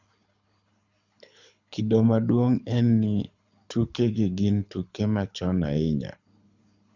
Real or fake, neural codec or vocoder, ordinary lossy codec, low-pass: fake; codec, 24 kHz, 6 kbps, HILCodec; Opus, 64 kbps; 7.2 kHz